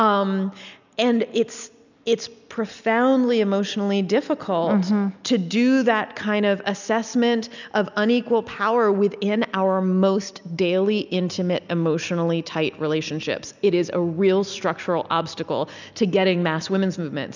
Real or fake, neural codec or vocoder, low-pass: real; none; 7.2 kHz